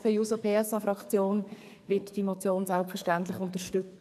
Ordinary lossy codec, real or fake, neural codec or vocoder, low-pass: none; fake; codec, 44.1 kHz, 2.6 kbps, SNAC; 14.4 kHz